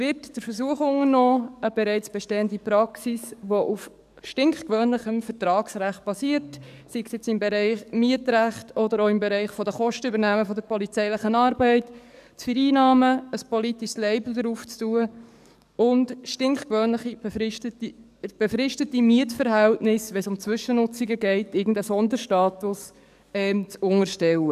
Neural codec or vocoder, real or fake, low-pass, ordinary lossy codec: codec, 44.1 kHz, 7.8 kbps, DAC; fake; 14.4 kHz; none